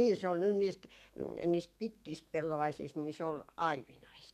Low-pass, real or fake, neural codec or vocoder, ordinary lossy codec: 14.4 kHz; fake; codec, 32 kHz, 1.9 kbps, SNAC; MP3, 96 kbps